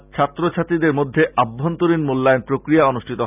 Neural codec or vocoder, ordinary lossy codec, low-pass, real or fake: none; none; 3.6 kHz; real